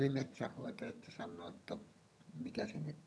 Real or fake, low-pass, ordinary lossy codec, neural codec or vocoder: fake; none; none; vocoder, 22.05 kHz, 80 mel bands, HiFi-GAN